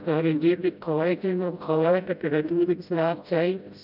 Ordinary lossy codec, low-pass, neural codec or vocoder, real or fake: none; 5.4 kHz; codec, 16 kHz, 0.5 kbps, FreqCodec, smaller model; fake